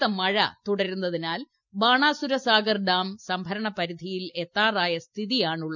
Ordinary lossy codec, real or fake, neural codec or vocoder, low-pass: none; real; none; 7.2 kHz